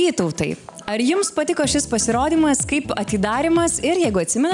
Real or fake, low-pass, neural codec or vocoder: real; 10.8 kHz; none